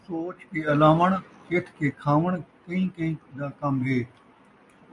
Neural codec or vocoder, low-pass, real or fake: none; 10.8 kHz; real